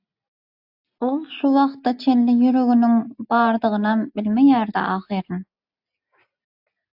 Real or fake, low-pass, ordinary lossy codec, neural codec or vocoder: real; 5.4 kHz; AAC, 48 kbps; none